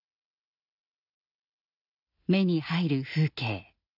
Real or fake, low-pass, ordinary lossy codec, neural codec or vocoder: real; 5.4 kHz; none; none